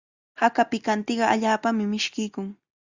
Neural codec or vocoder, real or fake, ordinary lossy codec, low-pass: none; real; Opus, 64 kbps; 7.2 kHz